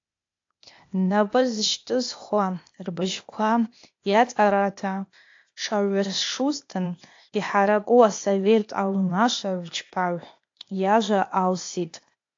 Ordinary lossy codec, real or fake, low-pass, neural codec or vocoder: AAC, 48 kbps; fake; 7.2 kHz; codec, 16 kHz, 0.8 kbps, ZipCodec